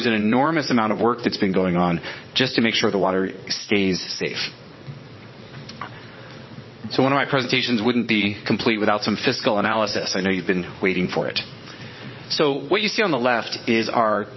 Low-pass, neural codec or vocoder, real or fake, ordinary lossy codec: 7.2 kHz; vocoder, 22.05 kHz, 80 mel bands, WaveNeXt; fake; MP3, 24 kbps